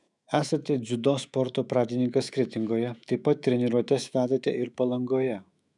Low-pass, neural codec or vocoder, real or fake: 10.8 kHz; autoencoder, 48 kHz, 128 numbers a frame, DAC-VAE, trained on Japanese speech; fake